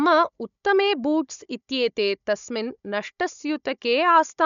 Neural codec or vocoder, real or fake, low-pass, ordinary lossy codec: codec, 16 kHz, 16 kbps, FunCodec, trained on Chinese and English, 50 frames a second; fake; 7.2 kHz; none